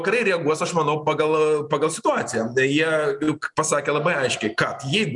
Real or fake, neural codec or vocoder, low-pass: real; none; 10.8 kHz